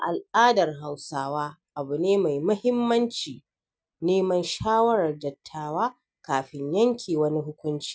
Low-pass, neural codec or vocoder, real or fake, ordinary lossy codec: none; none; real; none